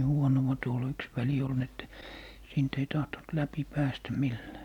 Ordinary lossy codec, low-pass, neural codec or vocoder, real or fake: none; 19.8 kHz; none; real